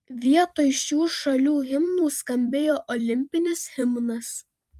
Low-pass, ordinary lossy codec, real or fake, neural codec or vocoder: 14.4 kHz; Opus, 32 kbps; fake; vocoder, 44.1 kHz, 128 mel bands every 256 samples, BigVGAN v2